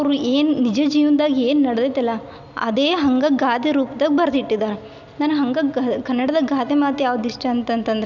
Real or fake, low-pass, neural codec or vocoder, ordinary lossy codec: real; 7.2 kHz; none; none